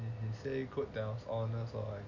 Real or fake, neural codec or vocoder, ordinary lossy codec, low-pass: real; none; none; 7.2 kHz